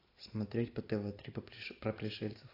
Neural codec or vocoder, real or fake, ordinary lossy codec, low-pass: none; real; AAC, 32 kbps; 5.4 kHz